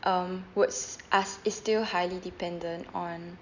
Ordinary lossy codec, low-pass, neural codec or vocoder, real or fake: none; 7.2 kHz; none; real